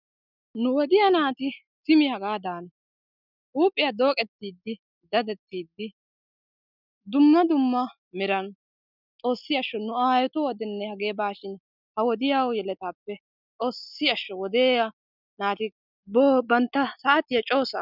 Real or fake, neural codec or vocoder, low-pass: real; none; 5.4 kHz